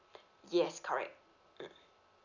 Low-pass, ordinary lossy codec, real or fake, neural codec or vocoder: 7.2 kHz; none; real; none